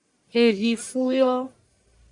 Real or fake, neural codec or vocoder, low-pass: fake; codec, 44.1 kHz, 1.7 kbps, Pupu-Codec; 10.8 kHz